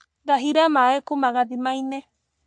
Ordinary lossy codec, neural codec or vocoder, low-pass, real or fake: MP3, 64 kbps; codec, 44.1 kHz, 3.4 kbps, Pupu-Codec; 9.9 kHz; fake